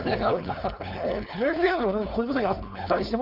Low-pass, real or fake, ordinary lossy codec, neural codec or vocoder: 5.4 kHz; fake; none; codec, 16 kHz, 4.8 kbps, FACodec